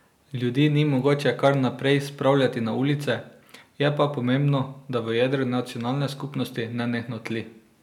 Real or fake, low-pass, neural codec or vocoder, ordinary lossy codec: real; 19.8 kHz; none; none